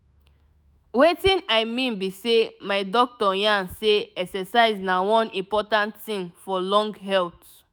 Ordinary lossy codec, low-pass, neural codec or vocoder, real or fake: none; none; autoencoder, 48 kHz, 128 numbers a frame, DAC-VAE, trained on Japanese speech; fake